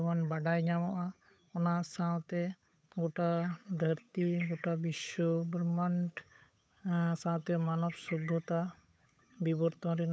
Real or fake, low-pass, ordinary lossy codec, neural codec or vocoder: fake; none; none; codec, 16 kHz, 8 kbps, FunCodec, trained on Chinese and English, 25 frames a second